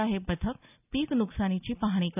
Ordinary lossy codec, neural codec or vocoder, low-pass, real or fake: none; none; 3.6 kHz; real